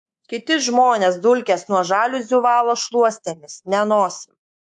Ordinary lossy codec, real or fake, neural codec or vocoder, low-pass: AAC, 64 kbps; fake; codec, 24 kHz, 3.1 kbps, DualCodec; 10.8 kHz